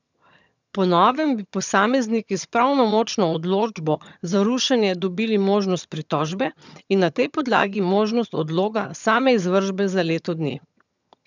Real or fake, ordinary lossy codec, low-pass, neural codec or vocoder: fake; none; 7.2 kHz; vocoder, 22.05 kHz, 80 mel bands, HiFi-GAN